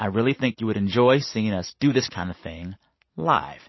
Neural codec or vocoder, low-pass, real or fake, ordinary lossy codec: none; 7.2 kHz; real; MP3, 24 kbps